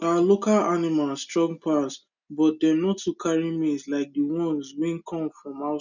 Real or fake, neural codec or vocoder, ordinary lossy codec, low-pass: real; none; none; 7.2 kHz